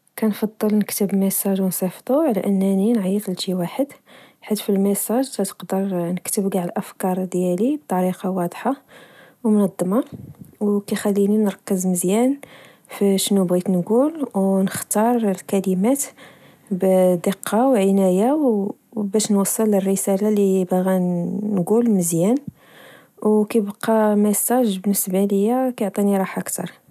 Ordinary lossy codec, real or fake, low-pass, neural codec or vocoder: none; real; 14.4 kHz; none